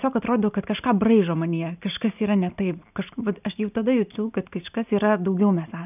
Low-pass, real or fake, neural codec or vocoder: 3.6 kHz; real; none